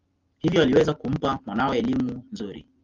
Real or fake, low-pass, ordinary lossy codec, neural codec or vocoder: real; 7.2 kHz; Opus, 24 kbps; none